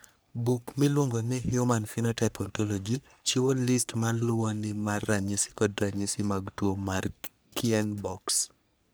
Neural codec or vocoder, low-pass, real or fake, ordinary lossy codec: codec, 44.1 kHz, 3.4 kbps, Pupu-Codec; none; fake; none